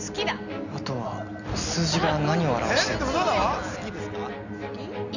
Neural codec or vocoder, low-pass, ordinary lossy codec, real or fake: none; 7.2 kHz; none; real